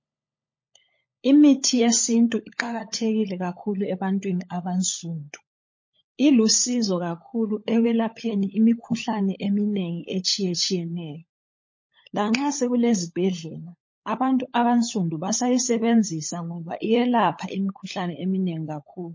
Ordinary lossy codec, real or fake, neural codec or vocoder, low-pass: MP3, 32 kbps; fake; codec, 16 kHz, 16 kbps, FunCodec, trained on LibriTTS, 50 frames a second; 7.2 kHz